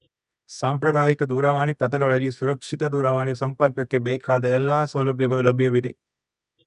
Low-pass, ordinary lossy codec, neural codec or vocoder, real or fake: 10.8 kHz; none; codec, 24 kHz, 0.9 kbps, WavTokenizer, medium music audio release; fake